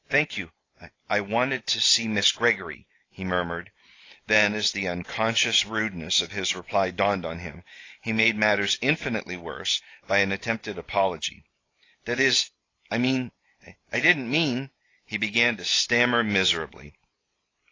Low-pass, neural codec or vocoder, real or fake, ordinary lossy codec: 7.2 kHz; none; real; AAC, 32 kbps